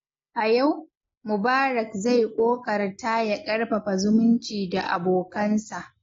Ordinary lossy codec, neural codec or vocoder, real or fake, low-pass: AAC, 32 kbps; none; real; 7.2 kHz